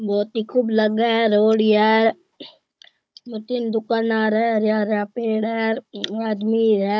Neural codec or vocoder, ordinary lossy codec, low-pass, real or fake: codec, 16 kHz, 16 kbps, FunCodec, trained on Chinese and English, 50 frames a second; none; none; fake